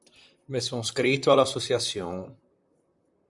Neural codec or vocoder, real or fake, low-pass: vocoder, 44.1 kHz, 128 mel bands, Pupu-Vocoder; fake; 10.8 kHz